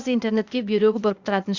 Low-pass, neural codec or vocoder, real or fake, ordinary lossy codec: 7.2 kHz; codec, 16 kHz, 0.8 kbps, ZipCodec; fake; Opus, 64 kbps